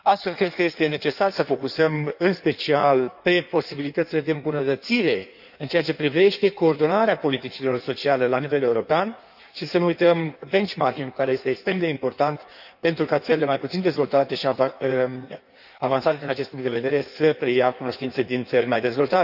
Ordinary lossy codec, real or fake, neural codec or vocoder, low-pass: none; fake; codec, 16 kHz in and 24 kHz out, 1.1 kbps, FireRedTTS-2 codec; 5.4 kHz